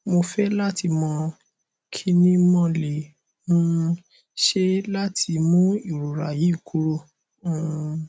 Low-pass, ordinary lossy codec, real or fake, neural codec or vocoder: none; none; real; none